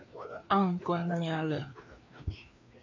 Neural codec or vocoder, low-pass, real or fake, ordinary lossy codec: codec, 16 kHz, 2 kbps, FreqCodec, larger model; 7.2 kHz; fake; MP3, 64 kbps